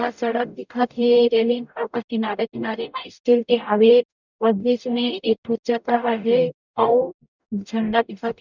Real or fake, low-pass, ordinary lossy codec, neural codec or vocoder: fake; 7.2 kHz; none; codec, 44.1 kHz, 0.9 kbps, DAC